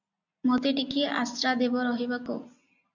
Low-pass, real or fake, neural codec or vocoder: 7.2 kHz; real; none